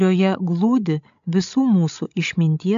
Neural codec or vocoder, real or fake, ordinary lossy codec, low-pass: codec, 16 kHz, 16 kbps, FreqCodec, larger model; fake; AAC, 64 kbps; 7.2 kHz